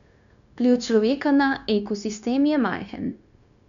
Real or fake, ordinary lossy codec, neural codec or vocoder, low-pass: fake; none; codec, 16 kHz, 0.9 kbps, LongCat-Audio-Codec; 7.2 kHz